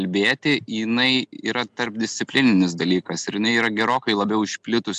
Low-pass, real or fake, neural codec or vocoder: 9.9 kHz; real; none